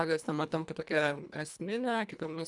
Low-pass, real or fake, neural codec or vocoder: 10.8 kHz; fake; codec, 24 kHz, 1.5 kbps, HILCodec